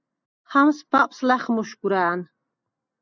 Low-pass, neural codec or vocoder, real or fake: 7.2 kHz; none; real